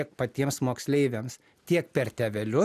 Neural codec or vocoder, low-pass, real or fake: none; 14.4 kHz; real